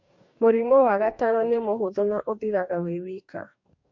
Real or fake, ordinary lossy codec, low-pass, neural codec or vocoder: fake; MP3, 48 kbps; 7.2 kHz; codec, 44.1 kHz, 2.6 kbps, DAC